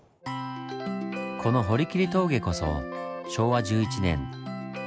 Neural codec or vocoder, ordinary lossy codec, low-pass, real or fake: none; none; none; real